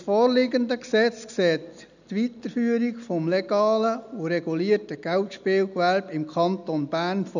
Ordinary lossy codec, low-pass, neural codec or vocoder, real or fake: none; 7.2 kHz; none; real